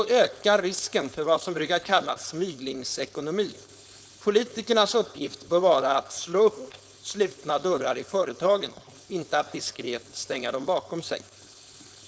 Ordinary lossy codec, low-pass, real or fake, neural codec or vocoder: none; none; fake; codec, 16 kHz, 4.8 kbps, FACodec